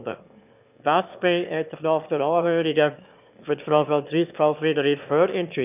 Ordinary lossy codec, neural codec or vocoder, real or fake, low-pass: none; autoencoder, 22.05 kHz, a latent of 192 numbers a frame, VITS, trained on one speaker; fake; 3.6 kHz